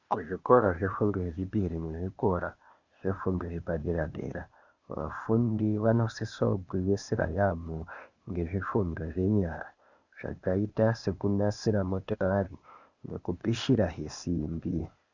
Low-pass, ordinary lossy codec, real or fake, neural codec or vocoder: 7.2 kHz; Opus, 64 kbps; fake; codec, 16 kHz, 0.8 kbps, ZipCodec